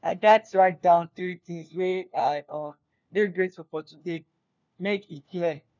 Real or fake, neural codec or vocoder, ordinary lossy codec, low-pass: fake; codec, 16 kHz, 1 kbps, FunCodec, trained on LibriTTS, 50 frames a second; none; 7.2 kHz